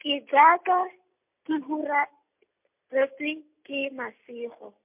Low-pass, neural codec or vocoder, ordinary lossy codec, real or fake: 3.6 kHz; none; MP3, 32 kbps; real